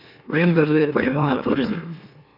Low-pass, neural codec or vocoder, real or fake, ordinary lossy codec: 5.4 kHz; autoencoder, 44.1 kHz, a latent of 192 numbers a frame, MeloTTS; fake; none